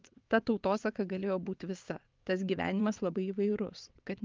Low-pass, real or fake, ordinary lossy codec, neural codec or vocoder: 7.2 kHz; fake; Opus, 24 kbps; vocoder, 22.05 kHz, 80 mel bands, WaveNeXt